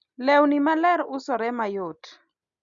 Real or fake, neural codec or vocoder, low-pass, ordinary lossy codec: real; none; 7.2 kHz; Opus, 64 kbps